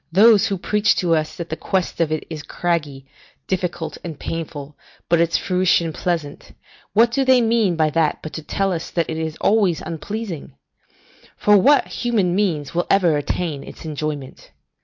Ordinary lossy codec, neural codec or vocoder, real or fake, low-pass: MP3, 48 kbps; none; real; 7.2 kHz